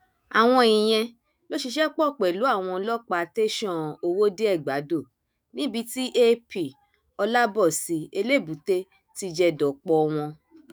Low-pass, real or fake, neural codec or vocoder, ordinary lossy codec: none; fake; autoencoder, 48 kHz, 128 numbers a frame, DAC-VAE, trained on Japanese speech; none